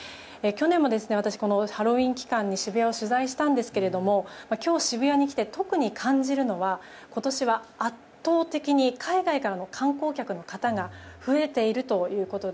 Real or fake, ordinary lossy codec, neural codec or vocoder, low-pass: real; none; none; none